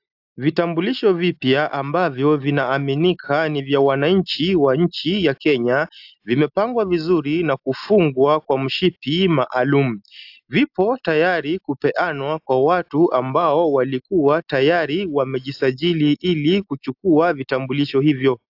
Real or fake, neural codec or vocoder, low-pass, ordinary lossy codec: real; none; 5.4 kHz; AAC, 48 kbps